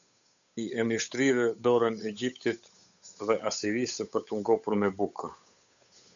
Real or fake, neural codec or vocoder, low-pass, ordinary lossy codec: fake; codec, 16 kHz, 8 kbps, FunCodec, trained on Chinese and English, 25 frames a second; 7.2 kHz; MP3, 96 kbps